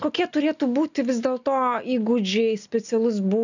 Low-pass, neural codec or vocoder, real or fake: 7.2 kHz; none; real